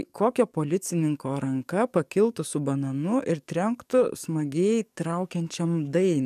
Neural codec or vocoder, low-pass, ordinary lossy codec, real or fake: codec, 44.1 kHz, 7.8 kbps, DAC; 14.4 kHz; MP3, 96 kbps; fake